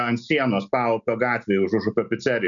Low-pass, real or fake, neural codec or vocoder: 7.2 kHz; real; none